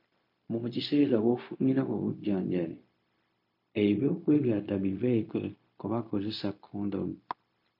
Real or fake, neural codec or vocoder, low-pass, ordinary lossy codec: fake; codec, 16 kHz, 0.4 kbps, LongCat-Audio-Codec; 5.4 kHz; MP3, 32 kbps